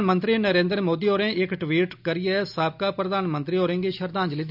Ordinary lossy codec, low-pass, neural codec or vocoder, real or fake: none; 5.4 kHz; none; real